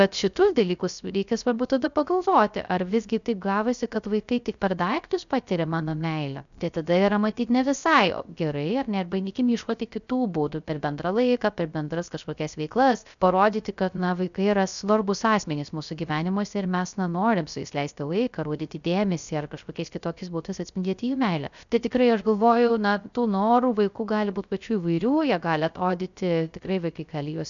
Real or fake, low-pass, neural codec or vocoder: fake; 7.2 kHz; codec, 16 kHz, 0.3 kbps, FocalCodec